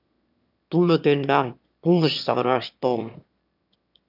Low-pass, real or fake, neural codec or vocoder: 5.4 kHz; fake; autoencoder, 22.05 kHz, a latent of 192 numbers a frame, VITS, trained on one speaker